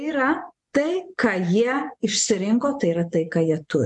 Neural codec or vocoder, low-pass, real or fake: vocoder, 24 kHz, 100 mel bands, Vocos; 10.8 kHz; fake